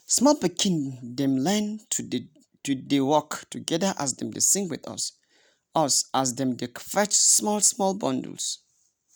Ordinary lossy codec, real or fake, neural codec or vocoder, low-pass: none; real; none; none